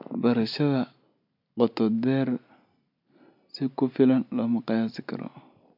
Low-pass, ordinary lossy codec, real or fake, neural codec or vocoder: 5.4 kHz; MP3, 48 kbps; real; none